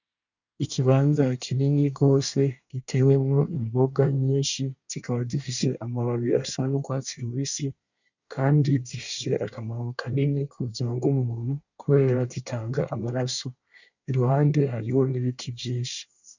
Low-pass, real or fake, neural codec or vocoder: 7.2 kHz; fake; codec, 24 kHz, 1 kbps, SNAC